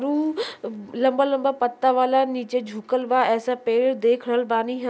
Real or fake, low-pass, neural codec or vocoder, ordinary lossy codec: real; none; none; none